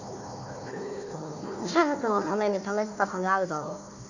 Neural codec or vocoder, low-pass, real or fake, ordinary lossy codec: codec, 16 kHz, 1 kbps, FunCodec, trained on Chinese and English, 50 frames a second; 7.2 kHz; fake; none